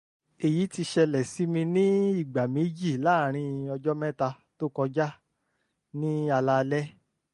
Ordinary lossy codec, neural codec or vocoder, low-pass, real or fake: MP3, 48 kbps; none; 10.8 kHz; real